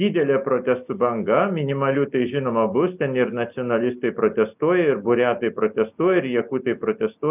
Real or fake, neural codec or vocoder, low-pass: real; none; 3.6 kHz